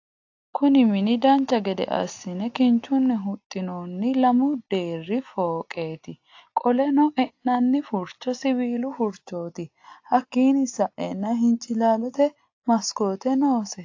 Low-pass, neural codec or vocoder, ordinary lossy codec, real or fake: 7.2 kHz; none; AAC, 48 kbps; real